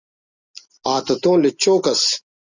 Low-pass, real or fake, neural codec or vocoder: 7.2 kHz; real; none